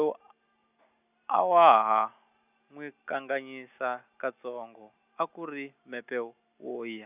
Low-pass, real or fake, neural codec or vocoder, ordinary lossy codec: 3.6 kHz; real; none; none